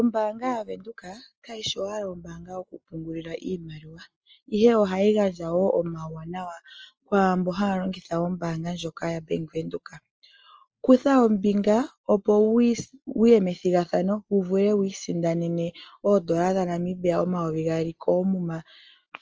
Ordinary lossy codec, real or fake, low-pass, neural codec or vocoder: Opus, 24 kbps; real; 7.2 kHz; none